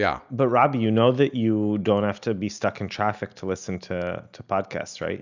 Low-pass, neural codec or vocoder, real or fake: 7.2 kHz; none; real